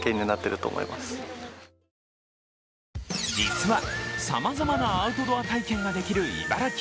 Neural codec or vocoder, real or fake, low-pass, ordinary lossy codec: none; real; none; none